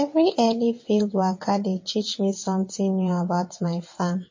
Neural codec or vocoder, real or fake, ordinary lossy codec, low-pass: vocoder, 22.05 kHz, 80 mel bands, WaveNeXt; fake; MP3, 32 kbps; 7.2 kHz